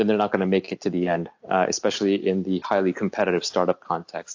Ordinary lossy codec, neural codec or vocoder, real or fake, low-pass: AAC, 48 kbps; none; real; 7.2 kHz